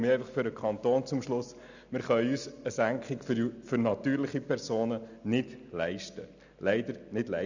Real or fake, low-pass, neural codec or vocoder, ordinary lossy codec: real; 7.2 kHz; none; none